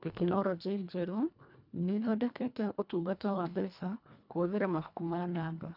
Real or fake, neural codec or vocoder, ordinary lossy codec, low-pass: fake; codec, 24 kHz, 1.5 kbps, HILCodec; none; 5.4 kHz